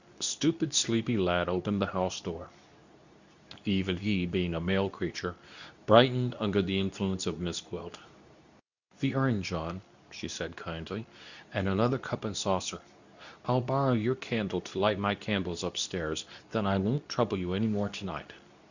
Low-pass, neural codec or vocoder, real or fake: 7.2 kHz; codec, 24 kHz, 0.9 kbps, WavTokenizer, medium speech release version 2; fake